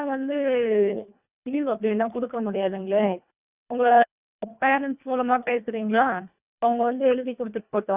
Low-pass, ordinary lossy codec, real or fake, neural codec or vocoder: 3.6 kHz; Opus, 64 kbps; fake; codec, 24 kHz, 1.5 kbps, HILCodec